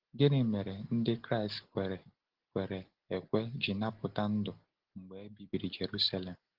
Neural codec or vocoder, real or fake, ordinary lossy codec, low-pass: none; real; Opus, 16 kbps; 5.4 kHz